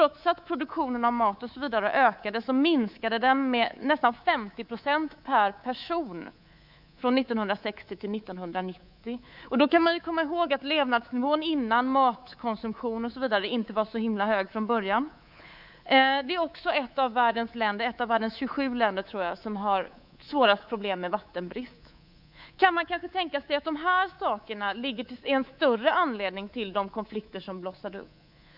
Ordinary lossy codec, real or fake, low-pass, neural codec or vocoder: none; fake; 5.4 kHz; codec, 24 kHz, 3.1 kbps, DualCodec